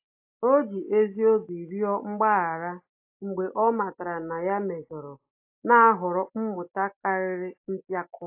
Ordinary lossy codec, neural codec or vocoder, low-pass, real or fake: AAC, 32 kbps; none; 3.6 kHz; real